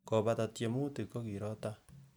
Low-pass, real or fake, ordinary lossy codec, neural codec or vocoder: none; real; none; none